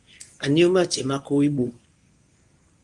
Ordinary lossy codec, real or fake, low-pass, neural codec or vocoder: Opus, 32 kbps; fake; 10.8 kHz; codec, 24 kHz, 0.9 kbps, WavTokenizer, medium speech release version 1